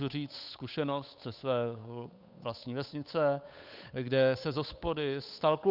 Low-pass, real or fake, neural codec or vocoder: 5.4 kHz; fake; codec, 16 kHz, 8 kbps, FunCodec, trained on Chinese and English, 25 frames a second